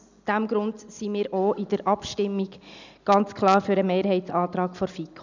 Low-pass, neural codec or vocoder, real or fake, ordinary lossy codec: 7.2 kHz; none; real; none